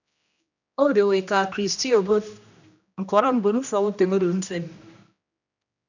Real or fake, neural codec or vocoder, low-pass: fake; codec, 16 kHz, 1 kbps, X-Codec, HuBERT features, trained on general audio; 7.2 kHz